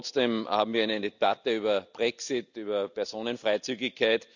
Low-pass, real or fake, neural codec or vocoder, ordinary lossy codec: 7.2 kHz; real; none; none